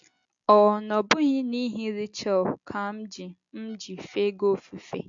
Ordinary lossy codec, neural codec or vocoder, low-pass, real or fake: AAC, 64 kbps; none; 7.2 kHz; real